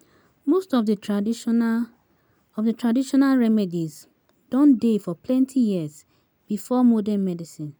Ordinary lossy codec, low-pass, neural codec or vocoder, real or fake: none; none; none; real